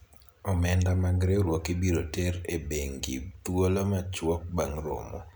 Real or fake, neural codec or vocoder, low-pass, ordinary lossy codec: real; none; none; none